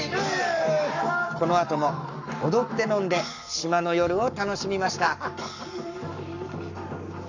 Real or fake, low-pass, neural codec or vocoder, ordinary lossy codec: fake; 7.2 kHz; codec, 44.1 kHz, 7.8 kbps, Pupu-Codec; none